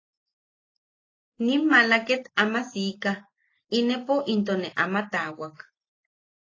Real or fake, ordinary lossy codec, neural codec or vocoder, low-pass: real; AAC, 32 kbps; none; 7.2 kHz